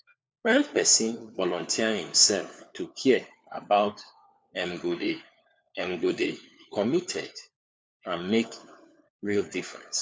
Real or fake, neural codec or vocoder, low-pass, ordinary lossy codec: fake; codec, 16 kHz, 4 kbps, FunCodec, trained on LibriTTS, 50 frames a second; none; none